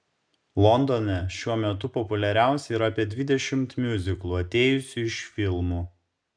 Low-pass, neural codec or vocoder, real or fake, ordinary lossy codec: 9.9 kHz; none; real; MP3, 96 kbps